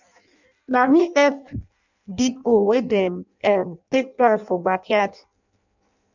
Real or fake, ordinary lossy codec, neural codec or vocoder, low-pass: fake; none; codec, 16 kHz in and 24 kHz out, 0.6 kbps, FireRedTTS-2 codec; 7.2 kHz